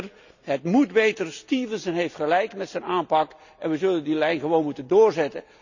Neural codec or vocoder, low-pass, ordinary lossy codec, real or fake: none; 7.2 kHz; none; real